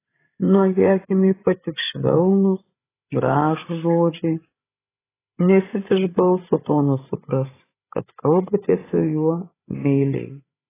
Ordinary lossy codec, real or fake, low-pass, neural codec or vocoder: AAC, 16 kbps; fake; 3.6 kHz; codec, 16 kHz, 16 kbps, FreqCodec, larger model